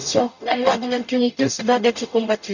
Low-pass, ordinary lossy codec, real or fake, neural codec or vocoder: 7.2 kHz; none; fake; codec, 44.1 kHz, 0.9 kbps, DAC